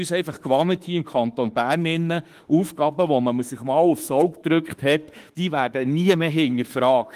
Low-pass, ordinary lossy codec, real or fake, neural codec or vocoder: 14.4 kHz; Opus, 24 kbps; fake; autoencoder, 48 kHz, 32 numbers a frame, DAC-VAE, trained on Japanese speech